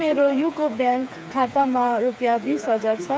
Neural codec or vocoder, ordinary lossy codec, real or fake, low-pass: codec, 16 kHz, 4 kbps, FreqCodec, smaller model; none; fake; none